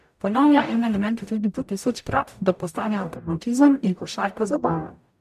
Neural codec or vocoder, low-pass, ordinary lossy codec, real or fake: codec, 44.1 kHz, 0.9 kbps, DAC; 14.4 kHz; MP3, 96 kbps; fake